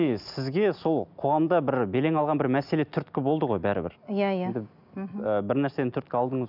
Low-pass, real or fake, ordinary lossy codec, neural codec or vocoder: 5.4 kHz; real; none; none